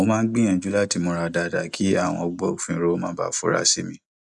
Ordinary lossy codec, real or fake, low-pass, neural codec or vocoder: none; real; 10.8 kHz; none